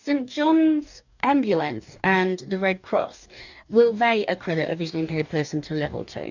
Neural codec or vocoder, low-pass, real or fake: codec, 44.1 kHz, 2.6 kbps, DAC; 7.2 kHz; fake